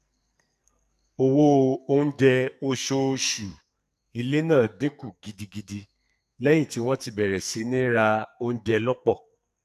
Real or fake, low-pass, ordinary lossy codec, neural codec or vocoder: fake; 14.4 kHz; none; codec, 44.1 kHz, 2.6 kbps, SNAC